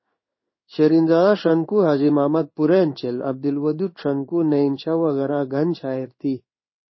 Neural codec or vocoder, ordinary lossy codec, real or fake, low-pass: codec, 16 kHz in and 24 kHz out, 1 kbps, XY-Tokenizer; MP3, 24 kbps; fake; 7.2 kHz